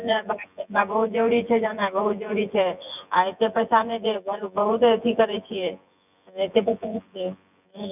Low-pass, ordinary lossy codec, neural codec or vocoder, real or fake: 3.6 kHz; none; vocoder, 24 kHz, 100 mel bands, Vocos; fake